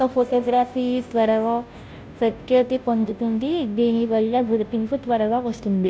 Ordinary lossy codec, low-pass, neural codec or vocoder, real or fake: none; none; codec, 16 kHz, 0.5 kbps, FunCodec, trained on Chinese and English, 25 frames a second; fake